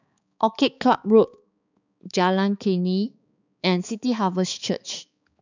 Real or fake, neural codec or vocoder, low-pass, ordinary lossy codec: fake; codec, 16 kHz, 4 kbps, X-Codec, HuBERT features, trained on balanced general audio; 7.2 kHz; none